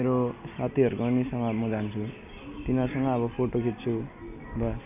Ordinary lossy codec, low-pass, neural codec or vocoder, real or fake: none; 3.6 kHz; none; real